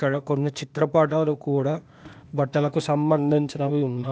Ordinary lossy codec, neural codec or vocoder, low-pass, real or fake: none; codec, 16 kHz, 0.8 kbps, ZipCodec; none; fake